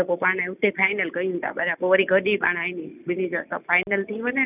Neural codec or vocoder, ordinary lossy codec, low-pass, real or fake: none; none; 3.6 kHz; real